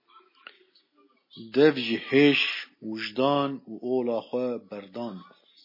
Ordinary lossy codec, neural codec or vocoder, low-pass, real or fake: MP3, 24 kbps; none; 5.4 kHz; real